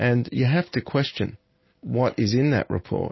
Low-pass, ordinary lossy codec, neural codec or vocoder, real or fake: 7.2 kHz; MP3, 24 kbps; none; real